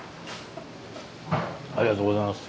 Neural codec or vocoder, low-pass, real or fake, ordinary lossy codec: none; none; real; none